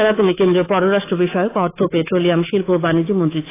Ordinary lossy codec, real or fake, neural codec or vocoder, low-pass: AAC, 16 kbps; fake; codec, 24 kHz, 3.1 kbps, DualCodec; 3.6 kHz